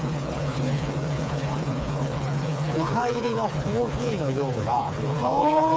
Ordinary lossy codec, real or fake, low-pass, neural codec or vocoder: none; fake; none; codec, 16 kHz, 4 kbps, FreqCodec, smaller model